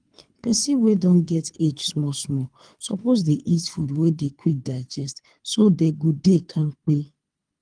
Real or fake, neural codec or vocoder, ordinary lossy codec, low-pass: fake; codec, 24 kHz, 3 kbps, HILCodec; Opus, 32 kbps; 9.9 kHz